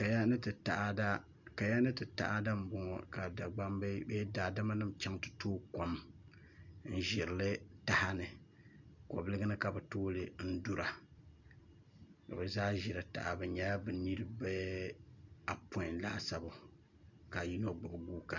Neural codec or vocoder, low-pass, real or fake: none; 7.2 kHz; real